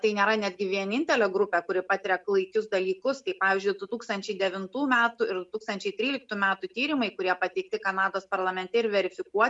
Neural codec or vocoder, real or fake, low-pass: none; real; 10.8 kHz